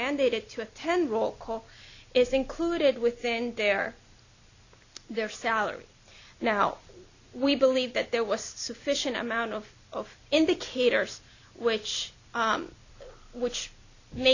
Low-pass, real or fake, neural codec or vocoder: 7.2 kHz; real; none